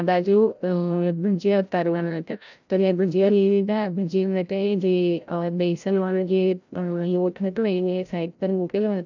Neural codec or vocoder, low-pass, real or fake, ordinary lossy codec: codec, 16 kHz, 0.5 kbps, FreqCodec, larger model; 7.2 kHz; fake; none